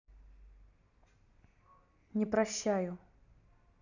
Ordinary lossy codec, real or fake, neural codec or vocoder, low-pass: none; real; none; 7.2 kHz